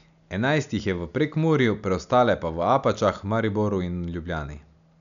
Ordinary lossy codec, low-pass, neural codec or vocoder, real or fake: none; 7.2 kHz; none; real